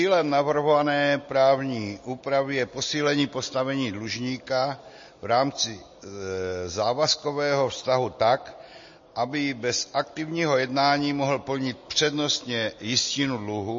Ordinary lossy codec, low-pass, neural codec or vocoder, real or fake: MP3, 32 kbps; 7.2 kHz; none; real